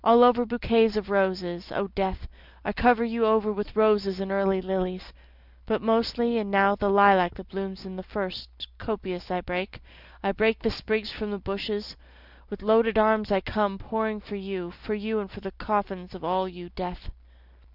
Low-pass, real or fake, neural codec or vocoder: 5.4 kHz; real; none